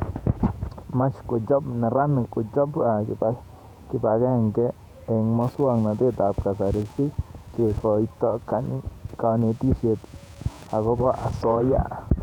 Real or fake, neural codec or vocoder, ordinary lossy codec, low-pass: fake; vocoder, 48 kHz, 128 mel bands, Vocos; none; 19.8 kHz